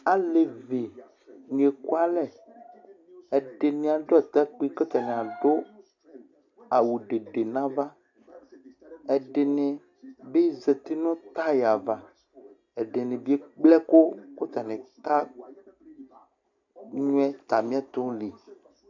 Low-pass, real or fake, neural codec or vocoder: 7.2 kHz; real; none